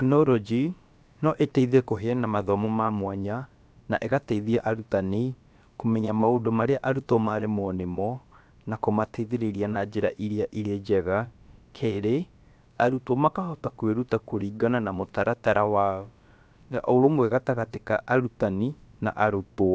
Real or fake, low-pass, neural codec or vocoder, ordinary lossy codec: fake; none; codec, 16 kHz, about 1 kbps, DyCAST, with the encoder's durations; none